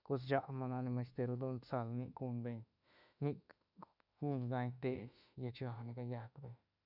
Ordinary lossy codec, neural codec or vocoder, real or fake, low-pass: none; autoencoder, 48 kHz, 32 numbers a frame, DAC-VAE, trained on Japanese speech; fake; 5.4 kHz